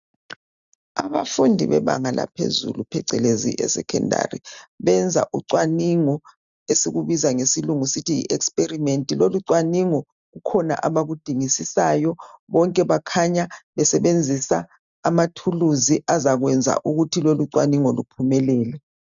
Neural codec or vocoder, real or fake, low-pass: none; real; 7.2 kHz